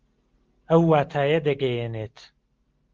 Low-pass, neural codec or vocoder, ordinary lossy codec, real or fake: 7.2 kHz; none; Opus, 16 kbps; real